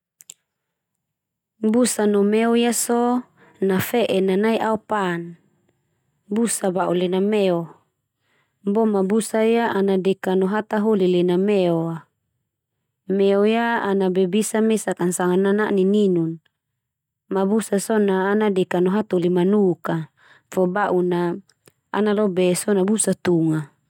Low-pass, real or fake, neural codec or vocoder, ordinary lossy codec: 19.8 kHz; real; none; none